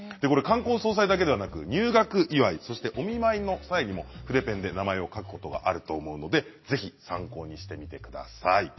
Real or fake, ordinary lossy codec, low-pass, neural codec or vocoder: real; MP3, 24 kbps; 7.2 kHz; none